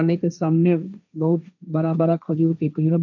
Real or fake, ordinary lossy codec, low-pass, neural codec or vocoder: fake; none; 7.2 kHz; codec, 16 kHz, 1.1 kbps, Voila-Tokenizer